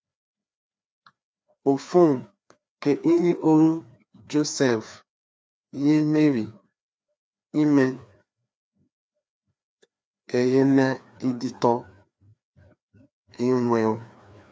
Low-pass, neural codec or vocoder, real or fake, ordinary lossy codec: none; codec, 16 kHz, 2 kbps, FreqCodec, larger model; fake; none